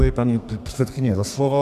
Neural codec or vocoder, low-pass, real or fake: codec, 32 kHz, 1.9 kbps, SNAC; 14.4 kHz; fake